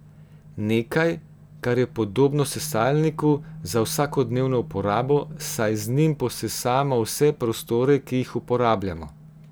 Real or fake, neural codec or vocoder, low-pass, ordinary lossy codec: real; none; none; none